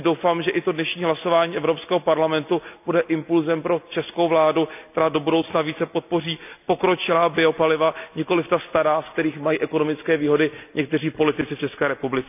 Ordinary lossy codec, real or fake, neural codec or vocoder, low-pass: none; real; none; 3.6 kHz